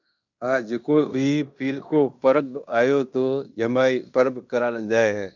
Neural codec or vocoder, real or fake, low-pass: codec, 16 kHz in and 24 kHz out, 0.9 kbps, LongCat-Audio-Codec, fine tuned four codebook decoder; fake; 7.2 kHz